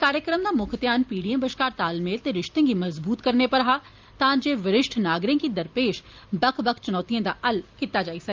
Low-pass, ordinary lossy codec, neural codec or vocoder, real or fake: 7.2 kHz; Opus, 24 kbps; none; real